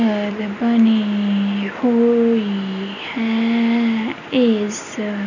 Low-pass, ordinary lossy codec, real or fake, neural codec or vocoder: 7.2 kHz; none; real; none